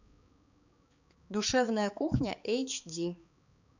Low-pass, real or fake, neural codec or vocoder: 7.2 kHz; fake; codec, 16 kHz, 4 kbps, X-Codec, HuBERT features, trained on balanced general audio